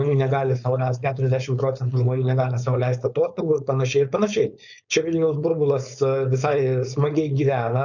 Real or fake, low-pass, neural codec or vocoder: fake; 7.2 kHz; codec, 16 kHz, 4.8 kbps, FACodec